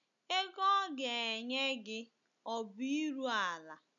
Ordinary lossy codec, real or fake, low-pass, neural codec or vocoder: none; real; 7.2 kHz; none